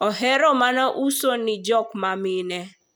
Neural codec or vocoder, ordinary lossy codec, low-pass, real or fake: none; none; none; real